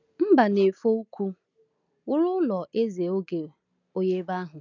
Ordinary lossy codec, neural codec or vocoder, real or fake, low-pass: none; none; real; 7.2 kHz